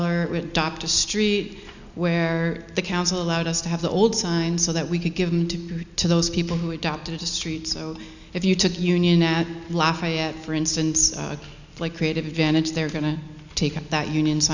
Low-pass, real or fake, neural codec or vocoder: 7.2 kHz; real; none